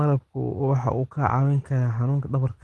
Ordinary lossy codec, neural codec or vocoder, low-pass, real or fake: Opus, 16 kbps; none; 10.8 kHz; real